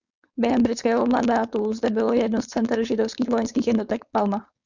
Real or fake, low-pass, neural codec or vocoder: fake; 7.2 kHz; codec, 16 kHz, 4.8 kbps, FACodec